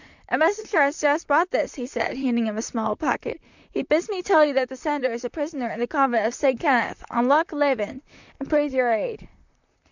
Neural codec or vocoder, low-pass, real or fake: vocoder, 44.1 kHz, 128 mel bands, Pupu-Vocoder; 7.2 kHz; fake